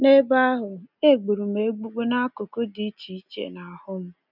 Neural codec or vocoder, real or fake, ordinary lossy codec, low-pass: none; real; none; 5.4 kHz